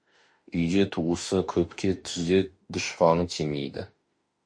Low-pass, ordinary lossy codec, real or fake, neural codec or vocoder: 9.9 kHz; MP3, 48 kbps; fake; autoencoder, 48 kHz, 32 numbers a frame, DAC-VAE, trained on Japanese speech